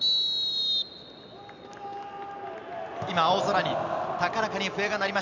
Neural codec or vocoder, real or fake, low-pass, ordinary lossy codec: none; real; 7.2 kHz; none